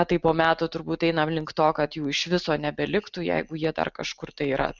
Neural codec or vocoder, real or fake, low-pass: none; real; 7.2 kHz